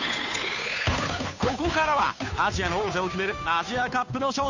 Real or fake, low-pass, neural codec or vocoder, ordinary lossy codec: fake; 7.2 kHz; codec, 16 kHz, 2 kbps, FunCodec, trained on Chinese and English, 25 frames a second; none